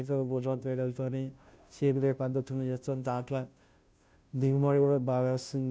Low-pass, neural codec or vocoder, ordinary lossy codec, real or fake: none; codec, 16 kHz, 0.5 kbps, FunCodec, trained on Chinese and English, 25 frames a second; none; fake